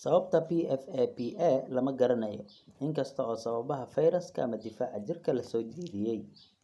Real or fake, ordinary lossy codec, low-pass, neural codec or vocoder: real; none; none; none